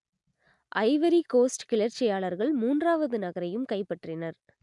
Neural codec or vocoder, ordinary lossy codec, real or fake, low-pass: none; none; real; 10.8 kHz